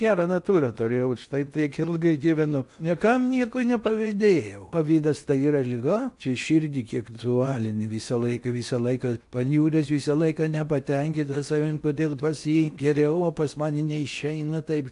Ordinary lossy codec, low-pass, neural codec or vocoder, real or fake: AAC, 64 kbps; 10.8 kHz; codec, 16 kHz in and 24 kHz out, 0.8 kbps, FocalCodec, streaming, 65536 codes; fake